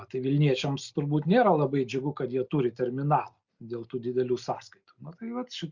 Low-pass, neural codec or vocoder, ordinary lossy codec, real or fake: 7.2 kHz; none; Opus, 64 kbps; real